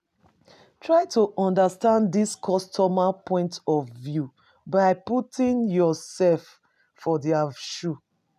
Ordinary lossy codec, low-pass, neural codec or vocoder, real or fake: none; 14.4 kHz; none; real